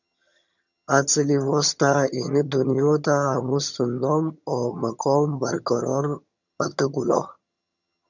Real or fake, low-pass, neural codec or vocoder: fake; 7.2 kHz; vocoder, 22.05 kHz, 80 mel bands, HiFi-GAN